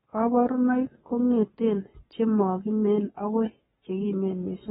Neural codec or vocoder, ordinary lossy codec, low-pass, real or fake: codec, 44.1 kHz, 7.8 kbps, Pupu-Codec; AAC, 16 kbps; 19.8 kHz; fake